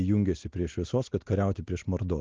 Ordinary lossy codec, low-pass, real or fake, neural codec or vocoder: Opus, 16 kbps; 7.2 kHz; real; none